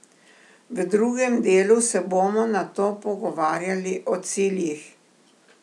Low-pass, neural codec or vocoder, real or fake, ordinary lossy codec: none; none; real; none